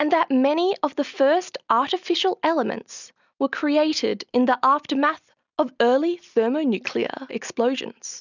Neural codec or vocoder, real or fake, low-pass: none; real; 7.2 kHz